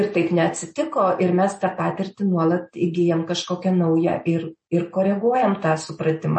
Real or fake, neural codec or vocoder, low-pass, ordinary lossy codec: real; none; 9.9 kHz; MP3, 32 kbps